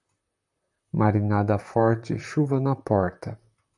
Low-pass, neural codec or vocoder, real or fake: 10.8 kHz; vocoder, 44.1 kHz, 128 mel bands, Pupu-Vocoder; fake